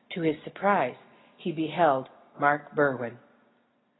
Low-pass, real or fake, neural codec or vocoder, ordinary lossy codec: 7.2 kHz; real; none; AAC, 16 kbps